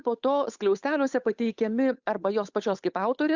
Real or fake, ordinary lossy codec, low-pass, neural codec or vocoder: fake; Opus, 64 kbps; 7.2 kHz; codec, 16 kHz, 8 kbps, FunCodec, trained on Chinese and English, 25 frames a second